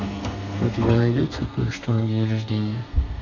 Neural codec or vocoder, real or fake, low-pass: codec, 32 kHz, 1.9 kbps, SNAC; fake; 7.2 kHz